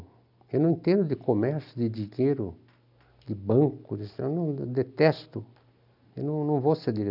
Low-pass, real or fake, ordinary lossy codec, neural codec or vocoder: 5.4 kHz; real; none; none